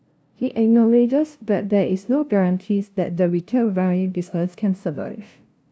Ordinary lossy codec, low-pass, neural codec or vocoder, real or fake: none; none; codec, 16 kHz, 0.5 kbps, FunCodec, trained on LibriTTS, 25 frames a second; fake